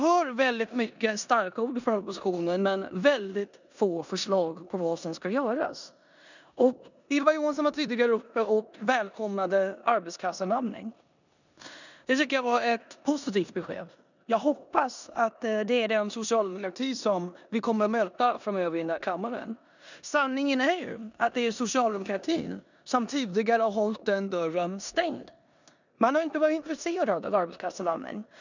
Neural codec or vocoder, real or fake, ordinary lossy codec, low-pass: codec, 16 kHz in and 24 kHz out, 0.9 kbps, LongCat-Audio-Codec, four codebook decoder; fake; none; 7.2 kHz